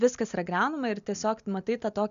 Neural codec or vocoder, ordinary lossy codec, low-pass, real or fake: none; MP3, 96 kbps; 7.2 kHz; real